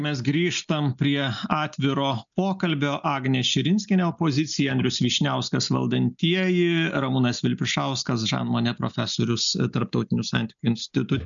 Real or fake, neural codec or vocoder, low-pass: real; none; 7.2 kHz